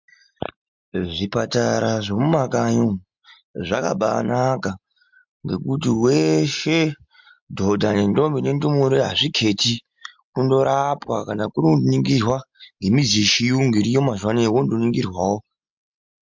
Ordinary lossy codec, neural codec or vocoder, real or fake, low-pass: MP3, 64 kbps; none; real; 7.2 kHz